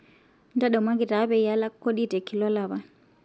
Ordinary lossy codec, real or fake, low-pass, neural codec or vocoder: none; real; none; none